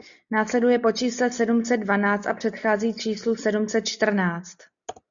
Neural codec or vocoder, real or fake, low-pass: none; real; 7.2 kHz